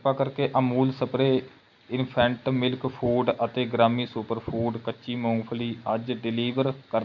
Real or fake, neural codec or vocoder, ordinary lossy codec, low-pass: real; none; none; 7.2 kHz